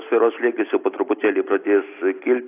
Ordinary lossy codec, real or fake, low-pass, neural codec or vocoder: AAC, 24 kbps; real; 3.6 kHz; none